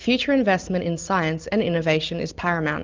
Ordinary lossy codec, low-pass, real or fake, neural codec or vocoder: Opus, 24 kbps; 7.2 kHz; real; none